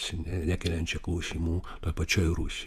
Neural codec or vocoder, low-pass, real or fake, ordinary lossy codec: vocoder, 48 kHz, 128 mel bands, Vocos; 14.4 kHz; fake; AAC, 96 kbps